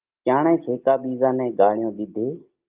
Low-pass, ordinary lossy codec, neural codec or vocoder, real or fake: 3.6 kHz; Opus, 24 kbps; none; real